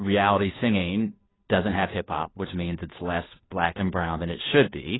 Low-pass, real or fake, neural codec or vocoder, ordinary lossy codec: 7.2 kHz; fake; codec, 16 kHz, 2 kbps, FunCodec, trained on Chinese and English, 25 frames a second; AAC, 16 kbps